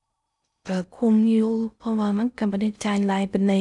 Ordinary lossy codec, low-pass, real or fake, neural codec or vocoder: none; 10.8 kHz; fake; codec, 16 kHz in and 24 kHz out, 0.6 kbps, FocalCodec, streaming, 4096 codes